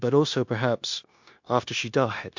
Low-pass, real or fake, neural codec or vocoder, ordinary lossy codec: 7.2 kHz; fake; codec, 16 kHz, 0.9 kbps, LongCat-Audio-Codec; MP3, 48 kbps